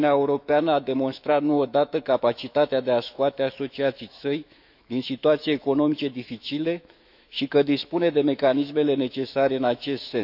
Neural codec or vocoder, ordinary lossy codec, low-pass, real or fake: autoencoder, 48 kHz, 128 numbers a frame, DAC-VAE, trained on Japanese speech; none; 5.4 kHz; fake